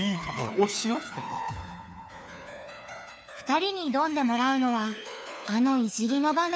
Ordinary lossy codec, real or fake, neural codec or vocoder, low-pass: none; fake; codec, 16 kHz, 4 kbps, FunCodec, trained on LibriTTS, 50 frames a second; none